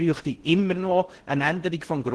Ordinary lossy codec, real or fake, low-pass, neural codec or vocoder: Opus, 16 kbps; fake; 10.8 kHz; codec, 16 kHz in and 24 kHz out, 0.6 kbps, FocalCodec, streaming, 4096 codes